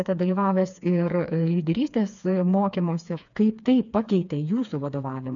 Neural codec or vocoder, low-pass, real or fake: codec, 16 kHz, 4 kbps, FreqCodec, smaller model; 7.2 kHz; fake